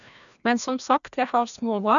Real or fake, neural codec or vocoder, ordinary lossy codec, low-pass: fake; codec, 16 kHz, 1 kbps, FreqCodec, larger model; none; 7.2 kHz